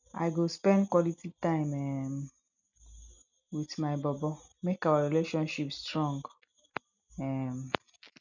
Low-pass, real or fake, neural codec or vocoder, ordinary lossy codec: 7.2 kHz; real; none; none